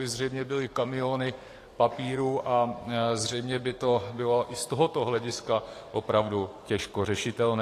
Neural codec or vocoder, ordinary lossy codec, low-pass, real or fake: codec, 44.1 kHz, 7.8 kbps, DAC; AAC, 48 kbps; 14.4 kHz; fake